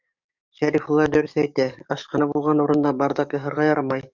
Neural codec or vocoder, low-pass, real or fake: codec, 44.1 kHz, 7.8 kbps, DAC; 7.2 kHz; fake